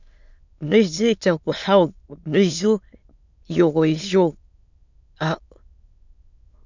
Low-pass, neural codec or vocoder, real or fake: 7.2 kHz; autoencoder, 22.05 kHz, a latent of 192 numbers a frame, VITS, trained on many speakers; fake